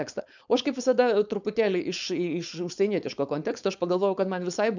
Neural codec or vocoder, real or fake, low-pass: codec, 16 kHz, 4.8 kbps, FACodec; fake; 7.2 kHz